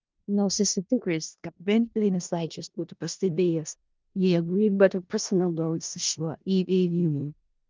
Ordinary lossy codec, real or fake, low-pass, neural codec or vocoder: Opus, 24 kbps; fake; 7.2 kHz; codec, 16 kHz in and 24 kHz out, 0.4 kbps, LongCat-Audio-Codec, four codebook decoder